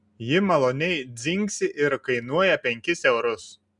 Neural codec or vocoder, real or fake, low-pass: none; real; 10.8 kHz